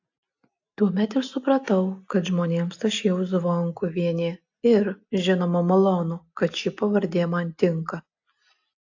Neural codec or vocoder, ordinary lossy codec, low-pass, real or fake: none; AAC, 48 kbps; 7.2 kHz; real